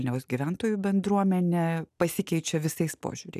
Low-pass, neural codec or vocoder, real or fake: 14.4 kHz; none; real